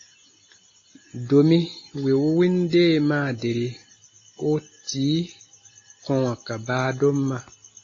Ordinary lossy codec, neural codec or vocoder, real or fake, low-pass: AAC, 32 kbps; none; real; 7.2 kHz